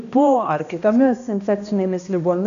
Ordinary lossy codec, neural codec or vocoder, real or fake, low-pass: AAC, 96 kbps; codec, 16 kHz, 1 kbps, X-Codec, HuBERT features, trained on balanced general audio; fake; 7.2 kHz